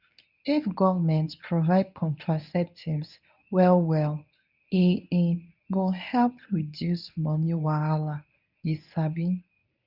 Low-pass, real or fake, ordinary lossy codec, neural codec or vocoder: 5.4 kHz; fake; none; codec, 24 kHz, 0.9 kbps, WavTokenizer, medium speech release version 1